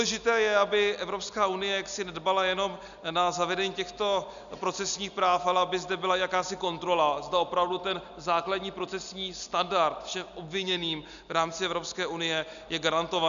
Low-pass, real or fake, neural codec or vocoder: 7.2 kHz; real; none